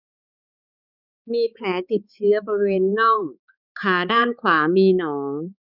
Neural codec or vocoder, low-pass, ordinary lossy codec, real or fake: codec, 16 kHz, 4 kbps, X-Codec, HuBERT features, trained on balanced general audio; 5.4 kHz; none; fake